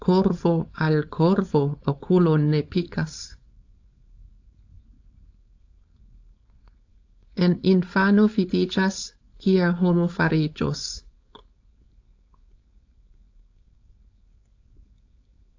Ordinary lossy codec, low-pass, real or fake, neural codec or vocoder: AAC, 48 kbps; 7.2 kHz; fake; codec, 16 kHz, 4.8 kbps, FACodec